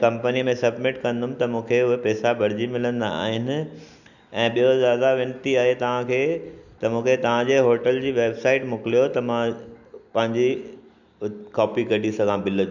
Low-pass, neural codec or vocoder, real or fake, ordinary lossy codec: 7.2 kHz; none; real; none